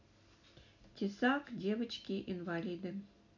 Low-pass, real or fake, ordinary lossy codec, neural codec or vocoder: 7.2 kHz; real; none; none